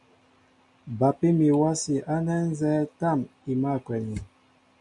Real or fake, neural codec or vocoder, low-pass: real; none; 10.8 kHz